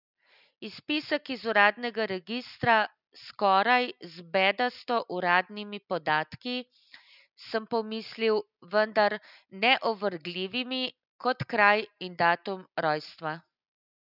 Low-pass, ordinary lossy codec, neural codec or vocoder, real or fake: 5.4 kHz; none; none; real